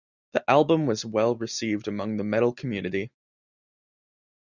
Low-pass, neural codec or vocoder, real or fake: 7.2 kHz; none; real